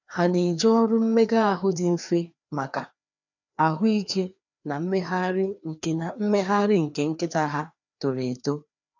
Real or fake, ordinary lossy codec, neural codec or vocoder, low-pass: fake; none; codec, 16 kHz, 2 kbps, FreqCodec, larger model; 7.2 kHz